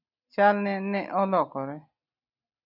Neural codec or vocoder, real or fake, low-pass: none; real; 5.4 kHz